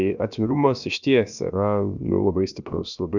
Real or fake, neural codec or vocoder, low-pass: fake; codec, 16 kHz, about 1 kbps, DyCAST, with the encoder's durations; 7.2 kHz